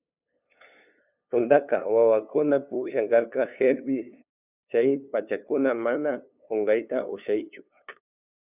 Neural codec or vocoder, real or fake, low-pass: codec, 16 kHz, 2 kbps, FunCodec, trained on LibriTTS, 25 frames a second; fake; 3.6 kHz